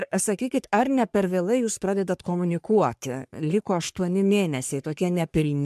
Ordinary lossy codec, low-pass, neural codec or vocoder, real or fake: MP3, 96 kbps; 14.4 kHz; codec, 44.1 kHz, 3.4 kbps, Pupu-Codec; fake